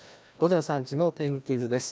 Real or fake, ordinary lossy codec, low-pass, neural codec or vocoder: fake; none; none; codec, 16 kHz, 1 kbps, FreqCodec, larger model